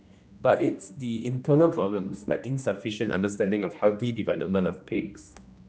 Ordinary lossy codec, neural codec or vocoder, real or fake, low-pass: none; codec, 16 kHz, 1 kbps, X-Codec, HuBERT features, trained on general audio; fake; none